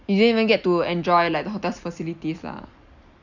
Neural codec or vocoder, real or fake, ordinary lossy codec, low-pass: none; real; none; 7.2 kHz